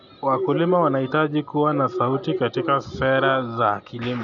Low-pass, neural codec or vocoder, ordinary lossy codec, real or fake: 7.2 kHz; none; none; real